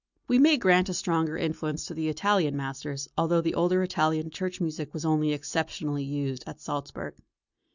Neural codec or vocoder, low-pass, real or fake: none; 7.2 kHz; real